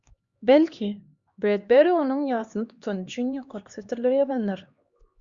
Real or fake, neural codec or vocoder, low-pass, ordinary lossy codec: fake; codec, 16 kHz, 2 kbps, X-Codec, HuBERT features, trained on LibriSpeech; 7.2 kHz; Opus, 64 kbps